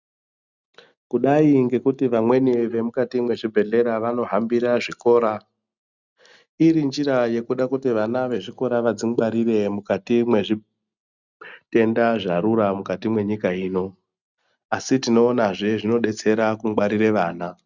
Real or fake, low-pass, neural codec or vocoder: real; 7.2 kHz; none